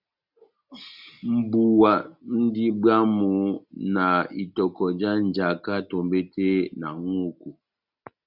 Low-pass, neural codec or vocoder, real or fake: 5.4 kHz; none; real